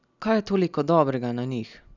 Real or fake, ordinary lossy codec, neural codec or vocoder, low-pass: real; none; none; 7.2 kHz